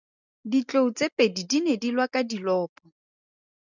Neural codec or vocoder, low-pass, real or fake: none; 7.2 kHz; real